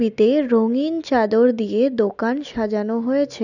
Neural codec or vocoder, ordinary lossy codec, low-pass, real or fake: none; none; 7.2 kHz; real